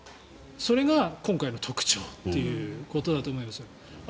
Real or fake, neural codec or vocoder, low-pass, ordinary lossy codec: real; none; none; none